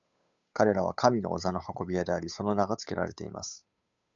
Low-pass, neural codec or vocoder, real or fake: 7.2 kHz; codec, 16 kHz, 8 kbps, FunCodec, trained on Chinese and English, 25 frames a second; fake